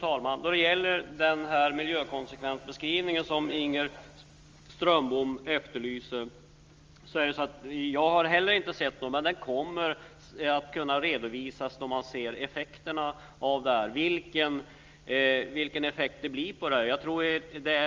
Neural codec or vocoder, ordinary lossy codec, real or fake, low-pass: none; Opus, 32 kbps; real; 7.2 kHz